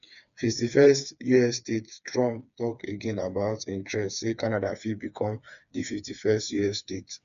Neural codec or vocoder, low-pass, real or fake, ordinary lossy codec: codec, 16 kHz, 4 kbps, FreqCodec, smaller model; 7.2 kHz; fake; none